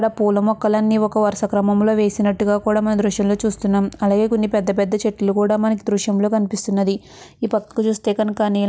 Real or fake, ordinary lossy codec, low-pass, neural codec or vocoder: real; none; none; none